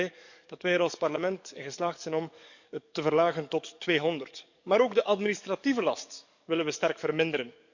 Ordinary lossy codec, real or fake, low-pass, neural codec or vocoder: none; fake; 7.2 kHz; codec, 44.1 kHz, 7.8 kbps, DAC